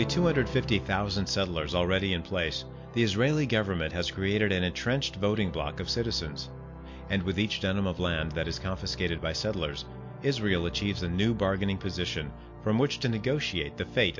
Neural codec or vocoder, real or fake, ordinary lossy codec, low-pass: none; real; MP3, 48 kbps; 7.2 kHz